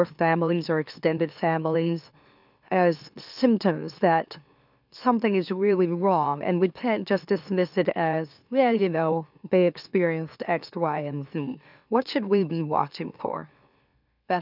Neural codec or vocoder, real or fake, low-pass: autoencoder, 44.1 kHz, a latent of 192 numbers a frame, MeloTTS; fake; 5.4 kHz